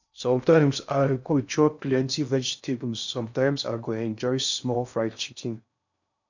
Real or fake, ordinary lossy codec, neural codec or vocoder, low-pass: fake; none; codec, 16 kHz in and 24 kHz out, 0.6 kbps, FocalCodec, streaming, 2048 codes; 7.2 kHz